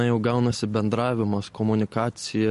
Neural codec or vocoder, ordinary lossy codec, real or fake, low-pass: none; MP3, 48 kbps; real; 14.4 kHz